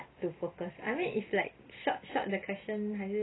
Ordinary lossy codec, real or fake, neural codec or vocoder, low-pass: AAC, 16 kbps; real; none; 7.2 kHz